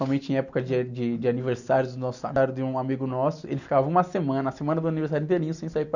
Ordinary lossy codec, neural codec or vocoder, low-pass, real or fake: none; none; 7.2 kHz; real